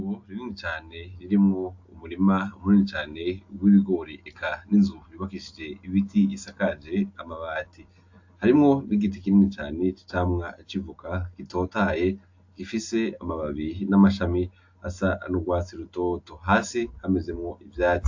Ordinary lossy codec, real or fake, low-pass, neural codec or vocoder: AAC, 48 kbps; real; 7.2 kHz; none